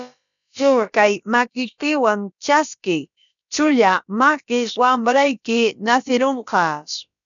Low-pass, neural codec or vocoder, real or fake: 7.2 kHz; codec, 16 kHz, about 1 kbps, DyCAST, with the encoder's durations; fake